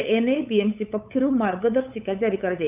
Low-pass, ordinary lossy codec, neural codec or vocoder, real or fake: 3.6 kHz; none; codec, 16 kHz, 8 kbps, FunCodec, trained on LibriTTS, 25 frames a second; fake